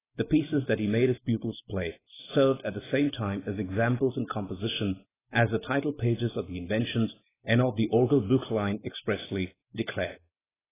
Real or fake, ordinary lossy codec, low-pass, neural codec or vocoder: real; AAC, 16 kbps; 3.6 kHz; none